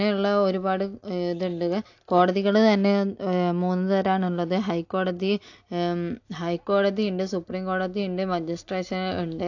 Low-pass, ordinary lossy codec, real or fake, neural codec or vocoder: 7.2 kHz; AAC, 48 kbps; real; none